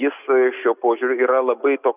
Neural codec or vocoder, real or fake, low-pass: none; real; 3.6 kHz